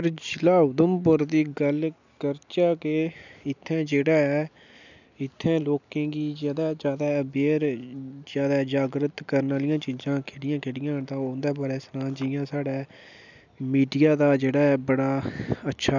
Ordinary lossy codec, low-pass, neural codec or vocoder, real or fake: none; 7.2 kHz; none; real